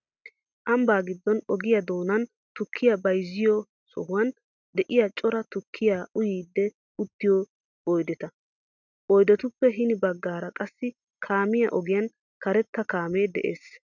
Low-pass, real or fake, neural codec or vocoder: 7.2 kHz; real; none